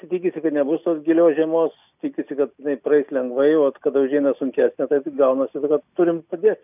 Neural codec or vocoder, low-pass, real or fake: none; 3.6 kHz; real